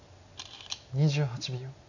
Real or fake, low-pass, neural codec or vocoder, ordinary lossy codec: real; 7.2 kHz; none; none